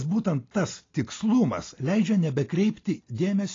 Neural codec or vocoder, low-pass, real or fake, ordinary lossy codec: none; 7.2 kHz; real; AAC, 32 kbps